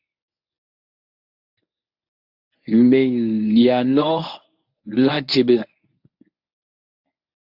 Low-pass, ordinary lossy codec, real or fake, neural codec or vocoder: 5.4 kHz; AAC, 48 kbps; fake; codec, 24 kHz, 0.9 kbps, WavTokenizer, medium speech release version 1